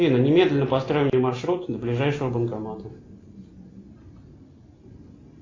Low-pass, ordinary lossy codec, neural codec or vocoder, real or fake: 7.2 kHz; AAC, 32 kbps; none; real